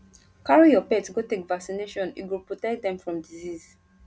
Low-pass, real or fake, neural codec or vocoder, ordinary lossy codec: none; real; none; none